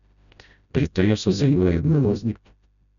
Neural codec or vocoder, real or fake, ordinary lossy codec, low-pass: codec, 16 kHz, 0.5 kbps, FreqCodec, smaller model; fake; none; 7.2 kHz